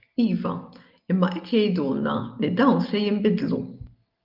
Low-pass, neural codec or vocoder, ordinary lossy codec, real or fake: 5.4 kHz; none; Opus, 24 kbps; real